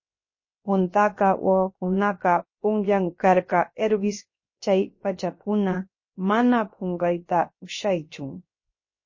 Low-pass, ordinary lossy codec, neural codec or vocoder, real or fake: 7.2 kHz; MP3, 32 kbps; codec, 16 kHz, 0.7 kbps, FocalCodec; fake